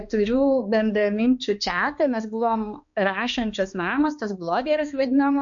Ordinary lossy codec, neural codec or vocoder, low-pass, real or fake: MP3, 48 kbps; codec, 16 kHz, 2 kbps, X-Codec, HuBERT features, trained on balanced general audio; 7.2 kHz; fake